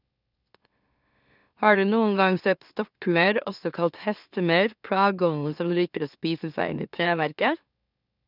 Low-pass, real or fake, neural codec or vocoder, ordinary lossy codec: 5.4 kHz; fake; autoencoder, 44.1 kHz, a latent of 192 numbers a frame, MeloTTS; none